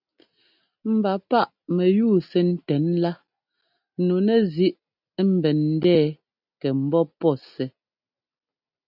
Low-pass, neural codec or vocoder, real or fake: 5.4 kHz; none; real